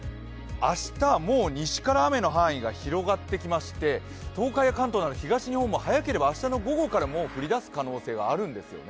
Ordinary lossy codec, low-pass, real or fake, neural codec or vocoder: none; none; real; none